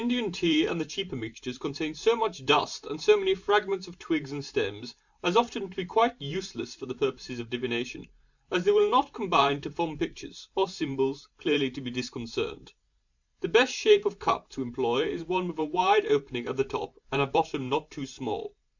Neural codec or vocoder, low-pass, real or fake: vocoder, 44.1 kHz, 128 mel bands every 512 samples, BigVGAN v2; 7.2 kHz; fake